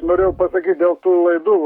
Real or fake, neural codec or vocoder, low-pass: fake; codec, 44.1 kHz, 7.8 kbps, Pupu-Codec; 19.8 kHz